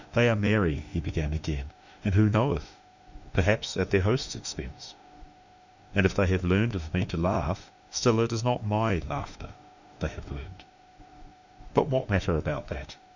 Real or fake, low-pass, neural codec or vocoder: fake; 7.2 kHz; autoencoder, 48 kHz, 32 numbers a frame, DAC-VAE, trained on Japanese speech